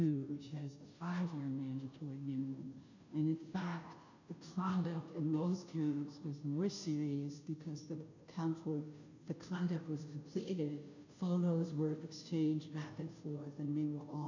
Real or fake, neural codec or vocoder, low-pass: fake; codec, 16 kHz, 0.5 kbps, FunCodec, trained on Chinese and English, 25 frames a second; 7.2 kHz